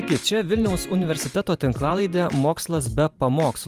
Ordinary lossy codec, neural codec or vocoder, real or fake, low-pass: Opus, 32 kbps; vocoder, 44.1 kHz, 128 mel bands every 512 samples, BigVGAN v2; fake; 14.4 kHz